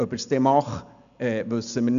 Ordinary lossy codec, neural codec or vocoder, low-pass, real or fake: none; none; 7.2 kHz; real